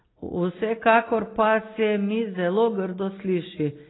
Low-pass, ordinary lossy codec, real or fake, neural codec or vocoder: 7.2 kHz; AAC, 16 kbps; real; none